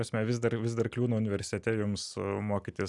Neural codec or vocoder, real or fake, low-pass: none; real; 10.8 kHz